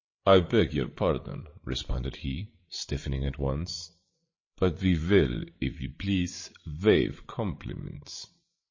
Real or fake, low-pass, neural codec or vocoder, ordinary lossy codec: fake; 7.2 kHz; codec, 16 kHz, 8 kbps, FreqCodec, larger model; MP3, 32 kbps